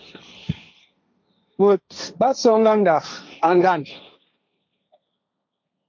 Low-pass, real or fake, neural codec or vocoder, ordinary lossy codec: 7.2 kHz; fake; codec, 16 kHz, 1.1 kbps, Voila-Tokenizer; MP3, 48 kbps